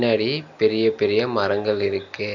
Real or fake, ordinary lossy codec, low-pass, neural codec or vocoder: real; none; 7.2 kHz; none